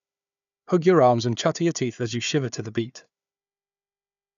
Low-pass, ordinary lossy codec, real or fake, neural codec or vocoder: 7.2 kHz; none; fake; codec, 16 kHz, 4 kbps, FunCodec, trained on Chinese and English, 50 frames a second